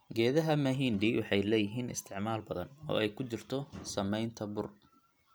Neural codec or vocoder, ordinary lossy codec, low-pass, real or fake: none; none; none; real